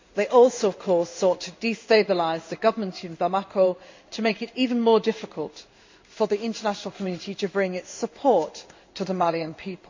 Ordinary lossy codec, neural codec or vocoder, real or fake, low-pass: MP3, 64 kbps; codec, 16 kHz in and 24 kHz out, 1 kbps, XY-Tokenizer; fake; 7.2 kHz